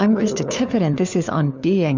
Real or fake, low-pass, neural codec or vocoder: fake; 7.2 kHz; codec, 16 kHz, 4 kbps, FunCodec, trained on LibriTTS, 50 frames a second